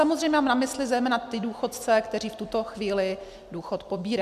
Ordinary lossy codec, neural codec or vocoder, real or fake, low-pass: MP3, 96 kbps; none; real; 14.4 kHz